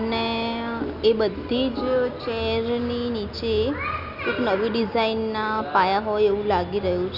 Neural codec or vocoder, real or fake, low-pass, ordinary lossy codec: none; real; 5.4 kHz; none